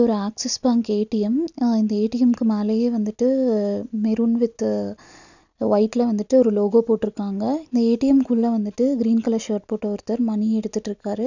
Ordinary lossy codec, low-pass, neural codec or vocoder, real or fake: none; 7.2 kHz; none; real